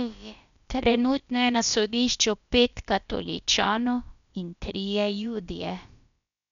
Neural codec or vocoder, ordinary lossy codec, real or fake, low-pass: codec, 16 kHz, about 1 kbps, DyCAST, with the encoder's durations; none; fake; 7.2 kHz